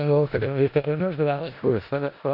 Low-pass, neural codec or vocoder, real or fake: 5.4 kHz; codec, 16 kHz in and 24 kHz out, 0.4 kbps, LongCat-Audio-Codec, four codebook decoder; fake